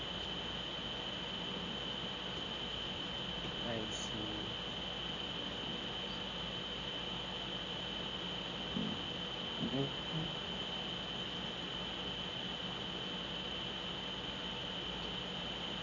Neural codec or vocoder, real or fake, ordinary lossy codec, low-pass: none; real; none; 7.2 kHz